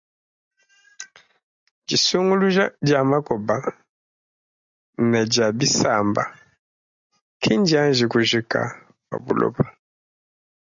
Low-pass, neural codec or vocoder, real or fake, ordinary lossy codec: 7.2 kHz; none; real; MP3, 96 kbps